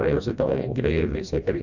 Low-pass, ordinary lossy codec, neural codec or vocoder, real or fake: 7.2 kHz; none; codec, 16 kHz, 1 kbps, FreqCodec, smaller model; fake